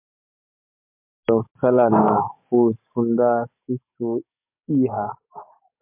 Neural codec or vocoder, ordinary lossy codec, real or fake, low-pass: codec, 44.1 kHz, 7.8 kbps, DAC; MP3, 32 kbps; fake; 3.6 kHz